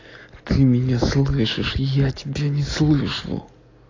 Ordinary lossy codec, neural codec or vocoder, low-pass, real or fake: AAC, 32 kbps; none; 7.2 kHz; real